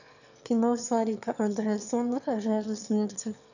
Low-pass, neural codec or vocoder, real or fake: 7.2 kHz; autoencoder, 22.05 kHz, a latent of 192 numbers a frame, VITS, trained on one speaker; fake